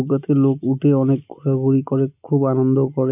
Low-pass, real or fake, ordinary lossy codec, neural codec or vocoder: 3.6 kHz; real; none; none